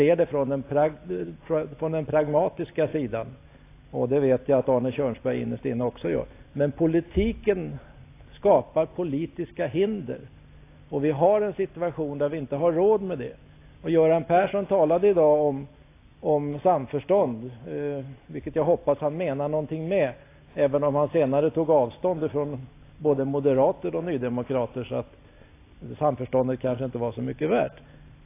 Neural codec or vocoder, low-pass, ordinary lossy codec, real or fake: none; 3.6 kHz; AAC, 24 kbps; real